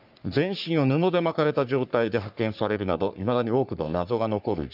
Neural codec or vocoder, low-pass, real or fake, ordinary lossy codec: codec, 44.1 kHz, 3.4 kbps, Pupu-Codec; 5.4 kHz; fake; none